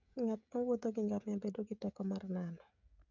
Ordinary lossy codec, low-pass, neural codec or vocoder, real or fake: none; 7.2 kHz; codec, 16 kHz, 16 kbps, FreqCodec, smaller model; fake